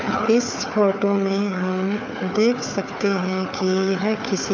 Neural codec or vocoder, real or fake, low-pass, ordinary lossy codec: codec, 16 kHz, 4 kbps, FunCodec, trained on Chinese and English, 50 frames a second; fake; none; none